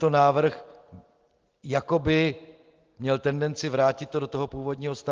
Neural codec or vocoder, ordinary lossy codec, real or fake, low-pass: none; Opus, 16 kbps; real; 7.2 kHz